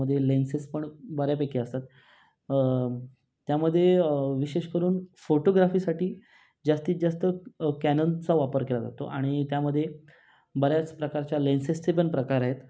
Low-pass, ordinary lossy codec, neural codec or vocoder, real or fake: none; none; none; real